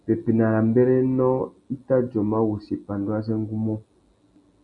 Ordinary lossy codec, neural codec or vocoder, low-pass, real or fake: MP3, 64 kbps; none; 10.8 kHz; real